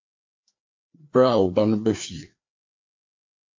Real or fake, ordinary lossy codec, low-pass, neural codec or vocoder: fake; MP3, 48 kbps; 7.2 kHz; codec, 16 kHz, 2 kbps, FreqCodec, larger model